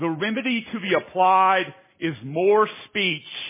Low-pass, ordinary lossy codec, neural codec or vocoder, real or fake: 3.6 kHz; MP3, 16 kbps; none; real